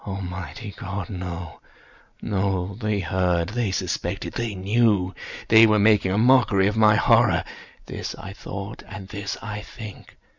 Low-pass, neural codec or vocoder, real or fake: 7.2 kHz; none; real